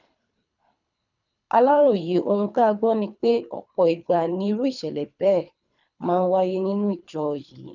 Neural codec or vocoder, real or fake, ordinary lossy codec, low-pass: codec, 24 kHz, 3 kbps, HILCodec; fake; none; 7.2 kHz